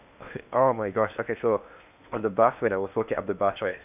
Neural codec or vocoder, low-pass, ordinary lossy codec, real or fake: codec, 16 kHz in and 24 kHz out, 0.8 kbps, FocalCodec, streaming, 65536 codes; 3.6 kHz; none; fake